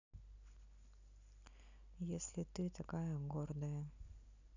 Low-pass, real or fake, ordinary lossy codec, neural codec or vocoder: 7.2 kHz; real; none; none